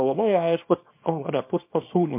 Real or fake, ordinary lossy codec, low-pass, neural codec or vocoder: fake; MP3, 32 kbps; 3.6 kHz; codec, 24 kHz, 0.9 kbps, WavTokenizer, small release